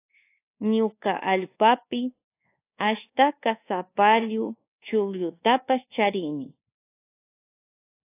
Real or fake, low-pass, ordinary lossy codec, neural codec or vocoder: fake; 3.6 kHz; AAC, 24 kbps; codec, 24 kHz, 1.2 kbps, DualCodec